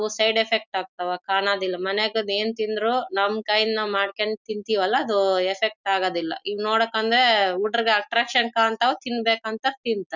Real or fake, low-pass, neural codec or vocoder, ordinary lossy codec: real; 7.2 kHz; none; none